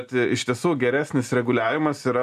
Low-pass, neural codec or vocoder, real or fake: 14.4 kHz; none; real